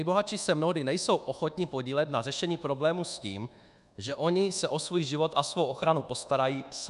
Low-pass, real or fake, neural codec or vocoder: 10.8 kHz; fake; codec, 24 kHz, 1.2 kbps, DualCodec